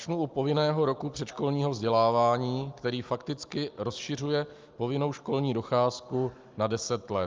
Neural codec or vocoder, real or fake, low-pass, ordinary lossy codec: none; real; 7.2 kHz; Opus, 24 kbps